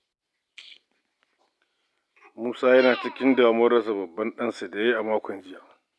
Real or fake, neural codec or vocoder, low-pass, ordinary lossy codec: real; none; none; none